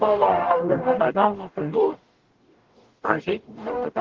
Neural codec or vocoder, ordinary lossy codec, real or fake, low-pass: codec, 44.1 kHz, 0.9 kbps, DAC; Opus, 16 kbps; fake; 7.2 kHz